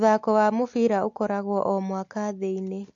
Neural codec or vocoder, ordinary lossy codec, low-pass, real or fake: none; AAC, 48 kbps; 7.2 kHz; real